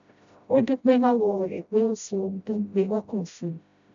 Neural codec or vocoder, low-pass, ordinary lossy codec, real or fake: codec, 16 kHz, 0.5 kbps, FreqCodec, smaller model; 7.2 kHz; AAC, 64 kbps; fake